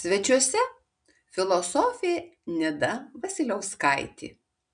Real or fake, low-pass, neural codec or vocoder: real; 9.9 kHz; none